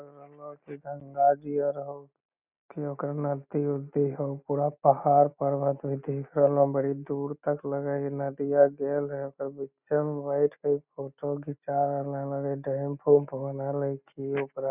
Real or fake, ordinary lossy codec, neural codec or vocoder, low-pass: real; none; none; 3.6 kHz